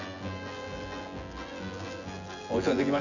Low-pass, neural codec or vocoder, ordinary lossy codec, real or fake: 7.2 kHz; vocoder, 24 kHz, 100 mel bands, Vocos; none; fake